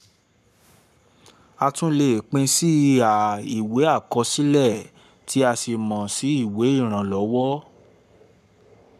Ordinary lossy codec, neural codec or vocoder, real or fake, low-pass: none; codec, 44.1 kHz, 7.8 kbps, Pupu-Codec; fake; 14.4 kHz